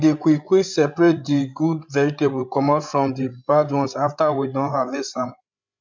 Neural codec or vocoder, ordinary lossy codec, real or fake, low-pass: codec, 16 kHz, 16 kbps, FreqCodec, larger model; MP3, 64 kbps; fake; 7.2 kHz